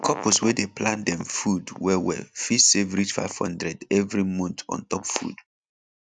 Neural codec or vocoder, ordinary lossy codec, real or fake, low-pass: none; none; real; none